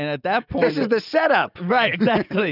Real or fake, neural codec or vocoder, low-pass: real; none; 5.4 kHz